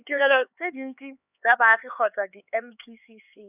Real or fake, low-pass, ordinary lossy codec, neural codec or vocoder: fake; 3.6 kHz; none; codec, 16 kHz, 4 kbps, X-Codec, HuBERT features, trained on LibriSpeech